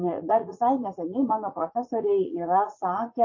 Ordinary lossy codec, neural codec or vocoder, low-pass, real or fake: MP3, 32 kbps; vocoder, 44.1 kHz, 80 mel bands, Vocos; 7.2 kHz; fake